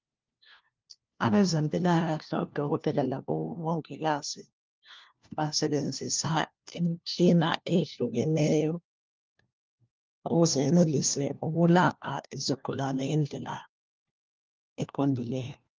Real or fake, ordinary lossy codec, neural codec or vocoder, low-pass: fake; Opus, 32 kbps; codec, 16 kHz, 1 kbps, FunCodec, trained on LibriTTS, 50 frames a second; 7.2 kHz